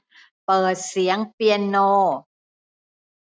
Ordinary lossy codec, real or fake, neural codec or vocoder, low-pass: none; real; none; none